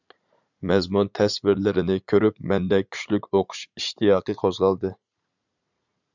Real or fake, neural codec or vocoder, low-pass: fake; vocoder, 44.1 kHz, 80 mel bands, Vocos; 7.2 kHz